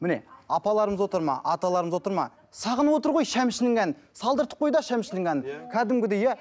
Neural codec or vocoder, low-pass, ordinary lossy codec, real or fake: none; none; none; real